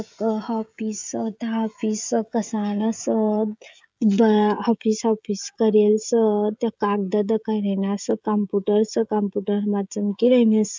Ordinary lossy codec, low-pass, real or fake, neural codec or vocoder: none; none; fake; codec, 16 kHz, 16 kbps, FreqCodec, smaller model